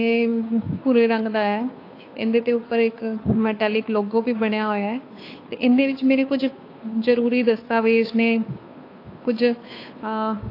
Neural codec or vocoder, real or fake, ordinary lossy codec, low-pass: codec, 16 kHz, 2 kbps, FunCodec, trained on Chinese and English, 25 frames a second; fake; AAC, 32 kbps; 5.4 kHz